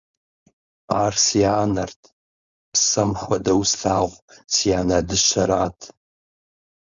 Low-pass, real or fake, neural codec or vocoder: 7.2 kHz; fake; codec, 16 kHz, 4.8 kbps, FACodec